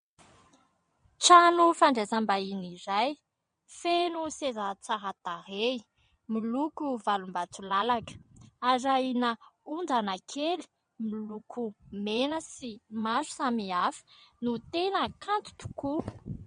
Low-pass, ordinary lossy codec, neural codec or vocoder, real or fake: 9.9 kHz; MP3, 48 kbps; vocoder, 22.05 kHz, 80 mel bands, WaveNeXt; fake